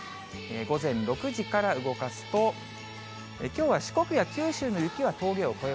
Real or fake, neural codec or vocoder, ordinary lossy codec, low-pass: real; none; none; none